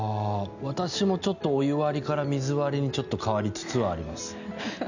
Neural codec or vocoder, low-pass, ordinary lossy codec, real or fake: none; 7.2 kHz; none; real